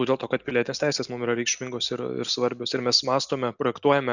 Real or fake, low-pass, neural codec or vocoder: real; 7.2 kHz; none